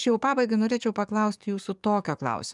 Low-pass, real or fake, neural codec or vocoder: 10.8 kHz; fake; codec, 44.1 kHz, 7.8 kbps, DAC